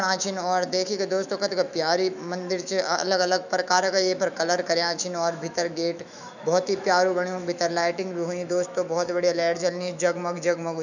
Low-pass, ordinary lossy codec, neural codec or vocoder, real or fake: 7.2 kHz; none; autoencoder, 48 kHz, 128 numbers a frame, DAC-VAE, trained on Japanese speech; fake